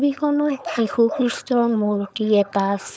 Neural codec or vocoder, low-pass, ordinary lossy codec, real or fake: codec, 16 kHz, 4.8 kbps, FACodec; none; none; fake